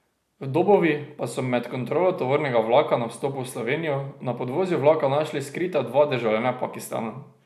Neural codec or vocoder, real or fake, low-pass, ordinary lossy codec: none; real; 14.4 kHz; none